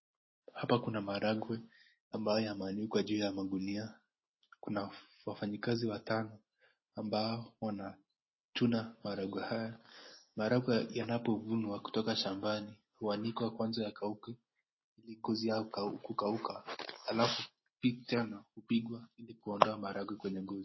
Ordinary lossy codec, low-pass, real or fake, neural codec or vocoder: MP3, 24 kbps; 7.2 kHz; real; none